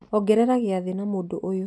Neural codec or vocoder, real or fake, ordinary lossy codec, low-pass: none; real; none; none